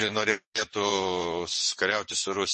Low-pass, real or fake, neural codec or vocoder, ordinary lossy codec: 9.9 kHz; fake; vocoder, 22.05 kHz, 80 mel bands, WaveNeXt; MP3, 32 kbps